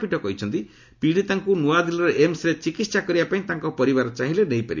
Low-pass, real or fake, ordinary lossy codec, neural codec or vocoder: 7.2 kHz; real; none; none